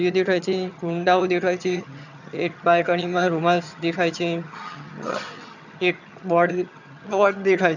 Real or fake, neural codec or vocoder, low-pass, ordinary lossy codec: fake; vocoder, 22.05 kHz, 80 mel bands, HiFi-GAN; 7.2 kHz; none